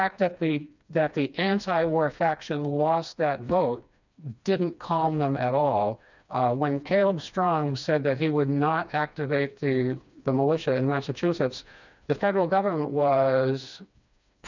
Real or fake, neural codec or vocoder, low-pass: fake; codec, 16 kHz, 2 kbps, FreqCodec, smaller model; 7.2 kHz